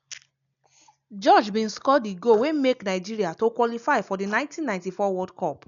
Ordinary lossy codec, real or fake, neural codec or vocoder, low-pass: none; real; none; 7.2 kHz